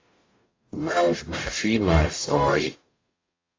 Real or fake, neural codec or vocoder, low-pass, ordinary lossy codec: fake; codec, 44.1 kHz, 0.9 kbps, DAC; 7.2 kHz; AAC, 32 kbps